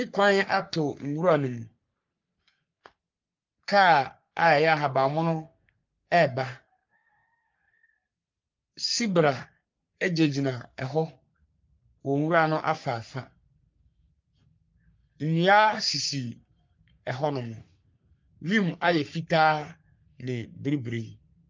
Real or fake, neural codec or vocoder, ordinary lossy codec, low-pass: fake; codec, 44.1 kHz, 3.4 kbps, Pupu-Codec; Opus, 24 kbps; 7.2 kHz